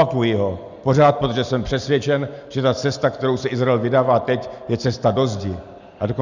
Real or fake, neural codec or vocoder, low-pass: real; none; 7.2 kHz